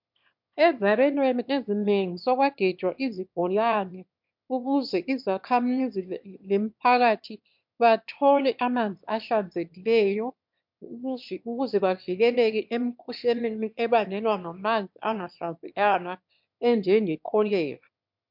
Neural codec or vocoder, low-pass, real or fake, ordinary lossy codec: autoencoder, 22.05 kHz, a latent of 192 numbers a frame, VITS, trained on one speaker; 5.4 kHz; fake; MP3, 48 kbps